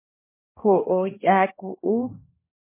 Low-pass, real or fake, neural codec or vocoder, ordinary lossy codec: 3.6 kHz; fake; codec, 16 kHz, 1 kbps, X-Codec, HuBERT features, trained on balanced general audio; MP3, 16 kbps